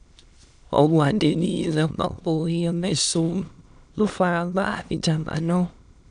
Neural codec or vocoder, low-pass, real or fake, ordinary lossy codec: autoencoder, 22.05 kHz, a latent of 192 numbers a frame, VITS, trained on many speakers; 9.9 kHz; fake; none